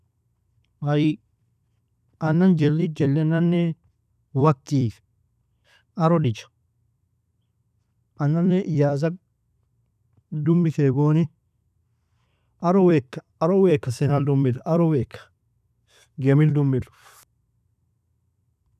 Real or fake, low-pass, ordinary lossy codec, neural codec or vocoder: fake; 14.4 kHz; none; vocoder, 44.1 kHz, 128 mel bands every 256 samples, BigVGAN v2